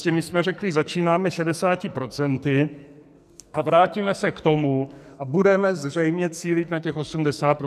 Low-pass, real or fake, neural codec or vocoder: 14.4 kHz; fake; codec, 44.1 kHz, 2.6 kbps, SNAC